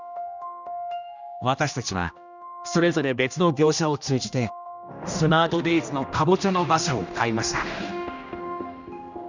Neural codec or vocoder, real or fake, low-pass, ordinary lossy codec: codec, 16 kHz, 1 kbps, X-Codec, HuBERT features, trained on general audio; fake; 7.2 kHz; none